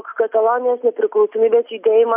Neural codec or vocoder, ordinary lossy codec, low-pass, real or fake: none; AAC, 32 kbps; 3.6 kHz; real